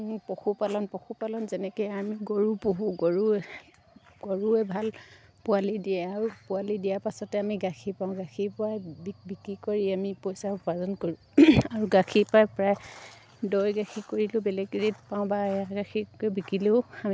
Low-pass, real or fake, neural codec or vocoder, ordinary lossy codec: none; real; none; none